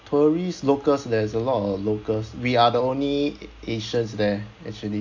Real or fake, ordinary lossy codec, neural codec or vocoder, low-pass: real; AAC, 48 kbps; none; 7.2 kHz